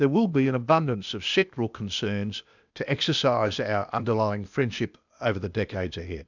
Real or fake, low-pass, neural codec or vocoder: fake; 7.2 kHz; codec, 16 kHz, 0.8 kbps, ZipCodec